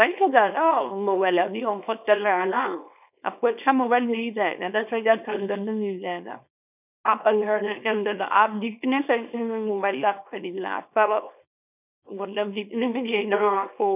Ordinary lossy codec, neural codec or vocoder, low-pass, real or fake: none; codec, 24 kHz, 0.9 kbps, WavTokenizer, small release; 3.6 kHz; fake